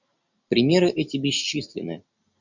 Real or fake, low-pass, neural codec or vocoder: real; 7.2 kHz; none